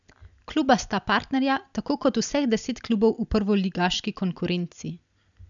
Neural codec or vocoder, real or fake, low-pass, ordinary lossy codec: none; real; 7.2 kHz; none